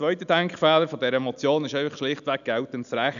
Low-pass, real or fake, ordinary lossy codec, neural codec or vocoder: 7.2 kHz; real; none; none